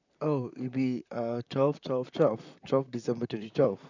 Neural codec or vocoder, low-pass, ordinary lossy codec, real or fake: vocoder, 44.1 kHz, 128 mel bands, Pupu-Vocoder; 7.2 kHz; none; fake